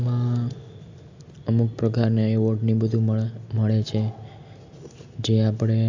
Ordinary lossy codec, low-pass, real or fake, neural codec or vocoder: AAC, 48 kbps; 7.2 kHz; real; none